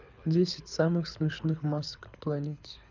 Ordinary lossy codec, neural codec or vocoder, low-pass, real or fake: none; codec, 24 kHz, 6 kbps, HILCodec; 7.2 kHz; fake